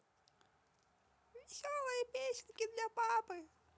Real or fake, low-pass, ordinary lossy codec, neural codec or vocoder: real; none; none; none